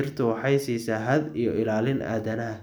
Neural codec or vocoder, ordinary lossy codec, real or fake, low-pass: none; none; real; none